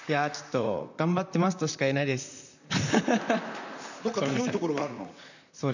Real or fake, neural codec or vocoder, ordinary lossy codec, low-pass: fake; vocoder, 44.1 kHz, 128 mel bands, Pupu-Vocoder; none; 7.2 kHz